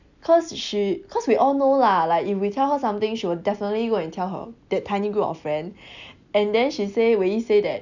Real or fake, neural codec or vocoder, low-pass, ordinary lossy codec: real; none; 7.2 kHz; none